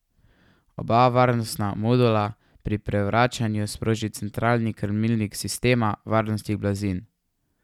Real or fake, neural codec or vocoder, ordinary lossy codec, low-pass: real; none; none; 19.8 kHz